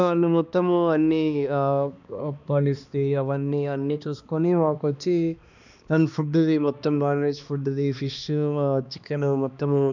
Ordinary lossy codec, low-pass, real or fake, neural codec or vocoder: none; 7.2 kHz; fake; codec, 16 kHz, 2 kbps, X-Codec, HuBERT features, trained on balanced general audio